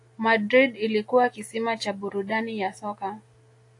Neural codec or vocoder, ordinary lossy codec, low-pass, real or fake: none; AAC, 48 kbps; 10.8 kHz; real